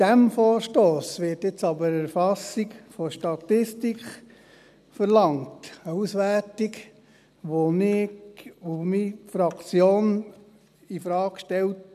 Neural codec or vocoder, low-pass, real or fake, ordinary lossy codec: none; 14.4 kHz; real; none